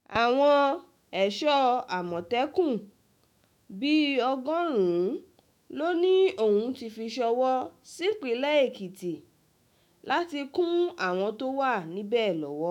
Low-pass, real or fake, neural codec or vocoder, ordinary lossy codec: 19.8 kHz; fake; autoencoder, 48 kHz, 128 numbers a frame, DAC-VAE, trained on Japanese speech; none